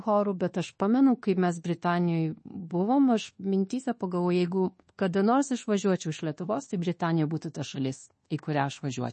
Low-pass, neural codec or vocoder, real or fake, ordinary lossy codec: 10.8 kHz; codec, 24 kHz, 1.2 kbps, DualCodec; fake; MP3, 32 kbps